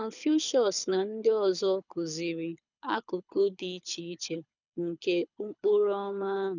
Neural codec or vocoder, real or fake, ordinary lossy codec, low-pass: codec, 24 kHz, 6 kbps, HILCodec; fake; none; 7.2 kHz